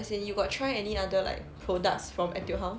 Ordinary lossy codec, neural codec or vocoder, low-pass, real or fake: none; none; none; real